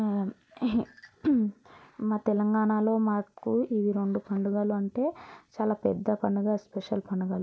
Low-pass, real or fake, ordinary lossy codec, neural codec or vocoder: none; real; none; none